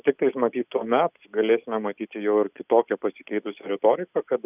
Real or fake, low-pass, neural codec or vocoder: real; 3.6 kHz; none